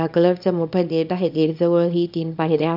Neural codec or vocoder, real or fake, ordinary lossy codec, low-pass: codec, 24 kHz, 0.9 kbps, WavTokenizer, small release; fake; none; 5.4 kHz